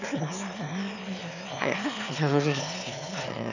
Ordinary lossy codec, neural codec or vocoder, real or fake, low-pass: none; autoencoder, 22.05 kHz, a latent of 192 numbers a frame, VITS, trained on one speaker; fake; 7.2 kHz